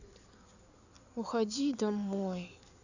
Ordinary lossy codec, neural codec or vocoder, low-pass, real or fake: none; codec, 16 kHz in and 24 kHz out, 2.2 kbps, FireRedTTS-2 codec; 7.2 kHz; fake